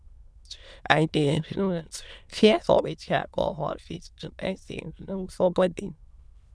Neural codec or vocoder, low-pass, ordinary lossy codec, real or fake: autoencoder, 22.05 kHz, a latent of 192 numbers a frame, VITS, trained on many speakers; none; none; fake